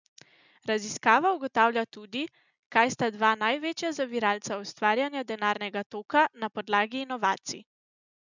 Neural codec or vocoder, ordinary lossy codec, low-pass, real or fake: none; none; 7.2 kHz; real